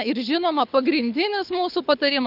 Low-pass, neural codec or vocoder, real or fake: 5.4 kHz; codec, 24 kHz, 6 kbps, HILCodec; fake